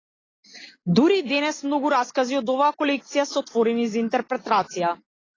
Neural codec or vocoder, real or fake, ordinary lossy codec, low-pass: none; real; AAC, 32 kbps; 7.2 kHz